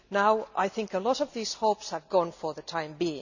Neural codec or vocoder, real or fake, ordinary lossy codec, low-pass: none; real; none; 7.2 kHz